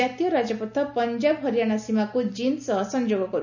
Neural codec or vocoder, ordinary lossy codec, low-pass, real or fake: none; none; 7.2 kHz; real